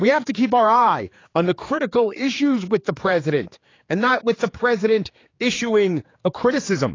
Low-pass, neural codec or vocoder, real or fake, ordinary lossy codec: 7.2 kHz; codec, 16 kHz, 4 kbps, X-Codec, HuBERT features, trained on general audio; fake; AAC, 32 kbps